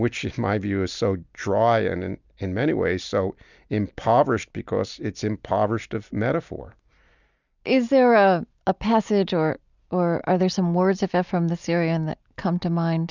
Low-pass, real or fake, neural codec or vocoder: 7.2 kHz; real; none